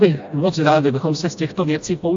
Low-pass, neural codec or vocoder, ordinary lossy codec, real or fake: 7.2 kHz; codec, 16 kHz, 1 kbps, FreqCodec, smaller model; AAC, 64 kbps; fake